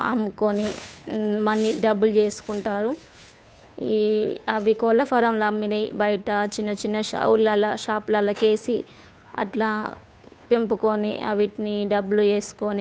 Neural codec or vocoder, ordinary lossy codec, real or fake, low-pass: codec, 16 kHz, 2 kbps, FunCodec, trained on Chinese and English, 25 frames a second; none; fake; none